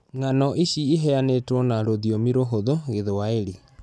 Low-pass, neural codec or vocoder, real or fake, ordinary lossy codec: none; none; real; none